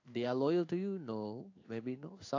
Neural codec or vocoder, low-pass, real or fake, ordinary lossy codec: codec, 16 kHz in and 24 kHz out, 1 kbps, XY-Tokenizer; 7.2 kHz; fake; AAC, 48 kbps